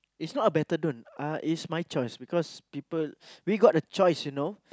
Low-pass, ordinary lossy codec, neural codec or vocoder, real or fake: none; none; none; real